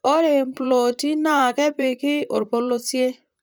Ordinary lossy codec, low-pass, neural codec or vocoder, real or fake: none; none; vocoder, 44.1 kHz, 128 mel bands, Pupu-Vocoder; fake